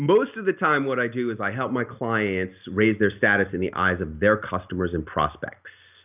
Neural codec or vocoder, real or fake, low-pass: none; real; 3.6 kHz